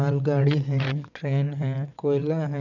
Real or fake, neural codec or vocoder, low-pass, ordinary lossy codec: fake; vocoder, 22.05 kHz, 80 mel bands, WaveNeXt; 7.2 kHz; none